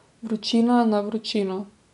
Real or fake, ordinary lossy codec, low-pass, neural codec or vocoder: real; none; 10.8 kHz; none